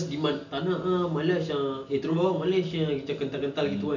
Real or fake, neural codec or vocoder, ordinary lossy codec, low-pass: real; none; none; 7.2 kHz